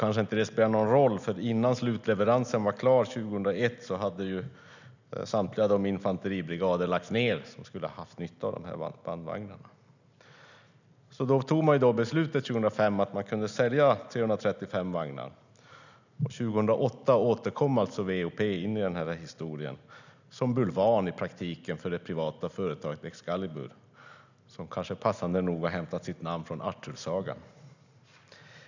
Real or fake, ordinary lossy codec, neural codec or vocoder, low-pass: real; none; none; 7.2 kHz